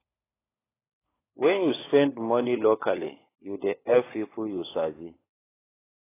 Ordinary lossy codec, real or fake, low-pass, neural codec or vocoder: AAC, 16 kbps; fake; 3.6 kHz; codec, 16 kHz, 16 kbps, FunCodec, trained on LibriTTS, 50 frames a second